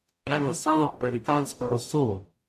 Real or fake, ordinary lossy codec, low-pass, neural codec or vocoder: fake; AAC, 96 kbps; 14.4 kHz; codec, 44.1 kHz, 0.9 kbps, DAC